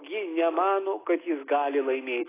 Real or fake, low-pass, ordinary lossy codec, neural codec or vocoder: real; 3.6 kHz; AAC, 16 kbps; none